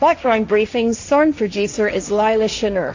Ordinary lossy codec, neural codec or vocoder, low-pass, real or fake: AAC, 48 kbps; codec, 16 kHz, 1.1 kbps, Voila-Tokenizer; 7.2 kHz; fake